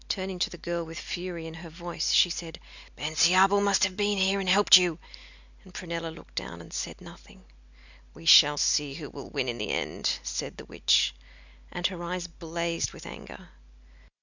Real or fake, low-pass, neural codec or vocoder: real; 7.2 kHz; none